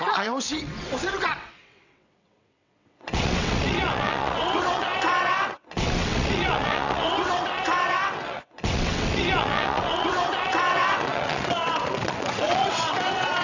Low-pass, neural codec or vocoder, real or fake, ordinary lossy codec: 7.2 kHz; vocoder, 22.05 kHz, 80 mel bands, WaveNeXt; fake; none